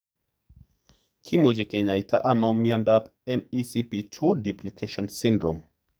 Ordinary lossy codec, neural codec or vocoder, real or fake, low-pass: none; codec, 44.1 kHz, 2.6 kbps, SNAC; fake; none